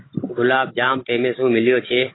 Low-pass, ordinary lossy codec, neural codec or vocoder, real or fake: 7.2 kHz; AAC, 16 kbps; codec, 16 kHz, 16 kbps, FunCodec, trained on Chinese and English, 50 frames a second; fake